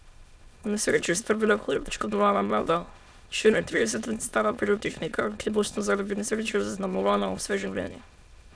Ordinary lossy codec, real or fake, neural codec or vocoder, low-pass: none; fake; autoencoder, 22.05 kHz, a latent of 192 numbers a frame, VITS, trained on many speakers; none